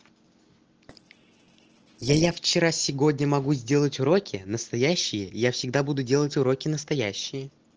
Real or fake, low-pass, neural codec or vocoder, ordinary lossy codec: real; 7.2 kHz; none; Opus, 16 kbps